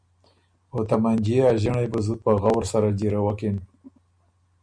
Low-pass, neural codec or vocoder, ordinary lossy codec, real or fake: 9.9 kHz; none; MP3, 96 kbps; real